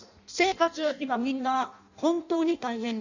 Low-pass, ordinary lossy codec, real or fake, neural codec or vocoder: 7.2 kHz; none; fake; codec, 16 kHz in and 24 kHz out, 0.6 kbps, FireRedTTS-2 codec